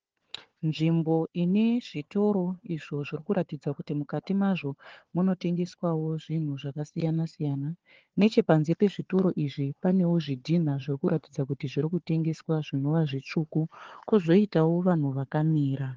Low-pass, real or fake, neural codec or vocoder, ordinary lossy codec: 7.2 kHz; fake; codec, 16 kHz, 4 kbps, FunCodec, trained on Chinese and English, 50 frames a second; Opus, 16 kbps